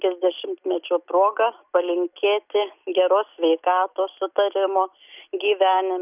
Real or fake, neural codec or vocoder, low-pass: real; none; 3.6 kHz